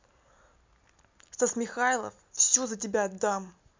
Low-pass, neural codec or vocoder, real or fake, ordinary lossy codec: 7.2 kHz; none; real; MP3, 64 kbps